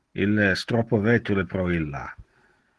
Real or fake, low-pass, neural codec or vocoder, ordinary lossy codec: real; 10.8 kHz; none; Opus, 16 kbps